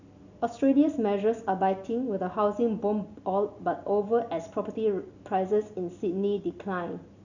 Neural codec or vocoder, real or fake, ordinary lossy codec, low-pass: none; real; none; 7.2 kHz